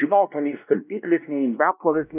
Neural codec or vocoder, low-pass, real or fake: codec, 16 kHz, 1 kbps, X-Codec, WavLM features, trained on Multilingual LibriSpeech; 3.6 kHz; fake